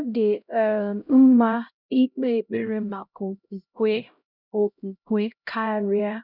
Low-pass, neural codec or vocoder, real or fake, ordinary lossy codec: 5.4 kHz; codec, 16 kHz, 0.5 kbps, X-Codec, HuBERT features, trained on LibriSpeech; fake; none